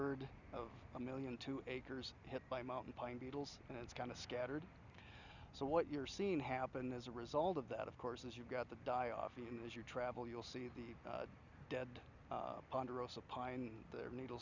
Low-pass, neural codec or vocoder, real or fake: 7.2 kHz; none; real